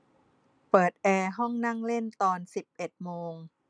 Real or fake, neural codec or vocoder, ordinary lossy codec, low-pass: real; none; none; 9.9 kHz